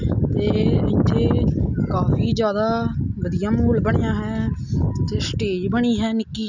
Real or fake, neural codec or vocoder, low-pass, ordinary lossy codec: real; none; 7.2 kHz; none